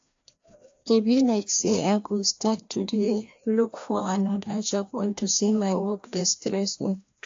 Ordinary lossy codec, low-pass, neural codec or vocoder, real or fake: AAC, 48 kbps; 7.2 kHz; codec, 16 kHz, 1 kbps, FreqCodec, larger model; fake